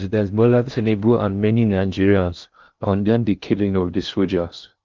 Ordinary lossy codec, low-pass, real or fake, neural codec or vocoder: Opus, 32 kbps; 7.2 kHz; fake; codec, 16 kHz in and 24 kHz out, 0.6 kbps, FocalCodec, streaming, 4096 codes